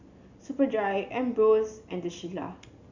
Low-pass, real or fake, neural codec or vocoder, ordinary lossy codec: 7.2 kHz; real; none; Opus, 64 kbps